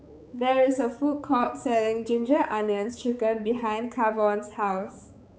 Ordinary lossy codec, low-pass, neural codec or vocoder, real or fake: none; none; codec, 16 kHz, 4 kbps, X-Codec, HuBERT features, trained on balanced general audio; fake